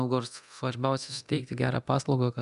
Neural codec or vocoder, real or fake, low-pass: codec, 24 kHz, 0.9 kbps, DualCodec; fake; 10.8 kHz